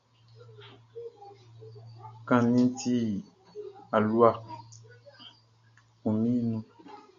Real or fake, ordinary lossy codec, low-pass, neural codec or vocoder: real; AAC, 64 kbps; 7.2 kHz; none